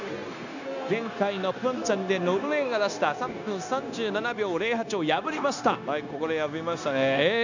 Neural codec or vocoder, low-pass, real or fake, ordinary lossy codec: codec, 16 kHz, 0.9 kbps, LongCat-Audio-Codec; 7.2 kHz; fake; none